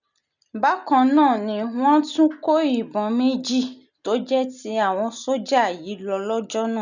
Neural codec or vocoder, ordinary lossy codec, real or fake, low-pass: none; none; real; 7.2 kHz